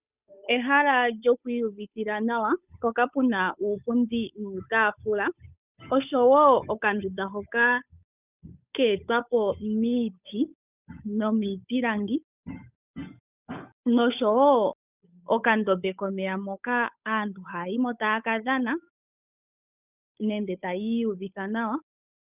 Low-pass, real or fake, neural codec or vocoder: 3.6 kHz; fake; codec, 16 kHz, 8 kbps, FunCodec, trained on Chinese and English, 25 frames a second